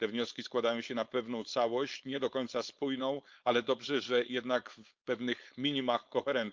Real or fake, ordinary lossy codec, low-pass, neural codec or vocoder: fake; Opus, 24 kbps; 7.2 kHz; codec, 16 kHz, 4.8 kbps, FACodec